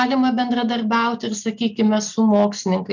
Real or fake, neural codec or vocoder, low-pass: real; none; 7.2 kHz